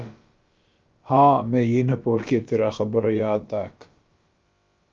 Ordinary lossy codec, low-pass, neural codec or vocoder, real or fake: Opus, 32 kbps; 7.2 kHz; codec, 16 kHz, about 1 kbps, DyCAST, with the encoder's durations; fake